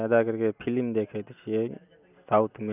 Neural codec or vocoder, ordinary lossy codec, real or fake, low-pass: none; none; real; 3.6 kHz